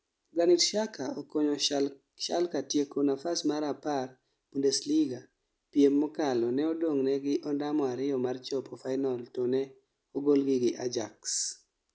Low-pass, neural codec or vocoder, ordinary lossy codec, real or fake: none; none; none; real